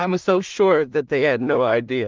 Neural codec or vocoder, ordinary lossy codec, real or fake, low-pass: codec, 16 kHz in and 24 kHz out, 0.4 kbps, LongCat-Audio-Codec, two codebook decoder; Opus, 24 kbps; fake; 7.2 kHz